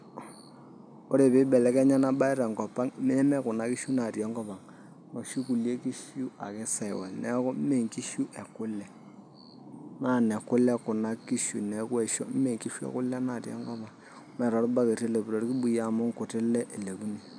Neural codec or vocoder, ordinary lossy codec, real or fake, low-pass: none; none; real; 9.9 kHz